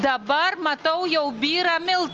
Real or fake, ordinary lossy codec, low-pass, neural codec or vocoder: real; Opus, 24 kbps; 7.2 kHz; none